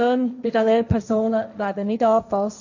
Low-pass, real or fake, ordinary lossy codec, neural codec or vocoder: 7.2 kHz; fake; none; codec, 16 kHz, 1.1 kbps, Voila-Tokenizer